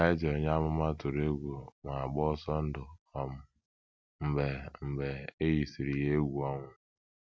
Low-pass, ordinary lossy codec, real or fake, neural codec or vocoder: none; none; real; none